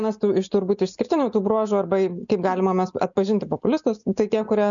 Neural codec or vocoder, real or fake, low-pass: none; real; 7.2 kHz